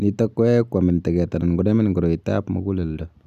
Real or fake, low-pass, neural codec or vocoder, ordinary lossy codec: real; 14.4 kHz; none; none